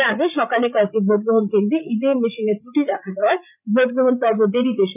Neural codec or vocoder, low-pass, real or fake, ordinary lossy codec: codec, 16 kHz, 8 kbps, FreqCodec, larger model; 3.6 kHz; fake; none